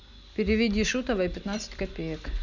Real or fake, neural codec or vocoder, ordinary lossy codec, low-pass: real; none; none; 7.2 kHz